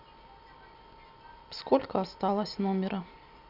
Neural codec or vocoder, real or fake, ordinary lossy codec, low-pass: none; real; none; 5.4 kHz